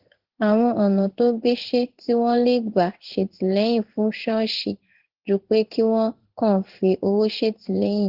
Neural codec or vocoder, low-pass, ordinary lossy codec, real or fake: codec, 16 kHz in and 24 kHz out, 1 kbps, XY-Tokenizer; 5.4 kHz; Opus, 16 kbps; fake